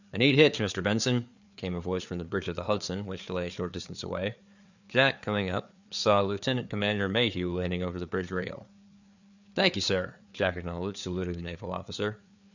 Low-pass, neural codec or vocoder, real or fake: 7.2 kHz; codec, 16 kHz, 4 kbps, FreqCodec, larger model; fake